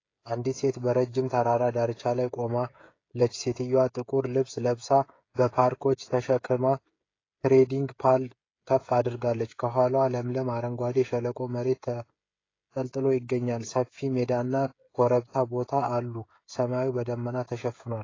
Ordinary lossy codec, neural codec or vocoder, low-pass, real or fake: AAC, 32 kbps; codec, 16 kHz, 16 kbps, FreqCodec, smaller model; 7.2 kHz; fake